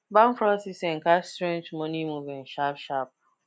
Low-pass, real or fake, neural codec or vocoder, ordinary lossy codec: none; real; none; none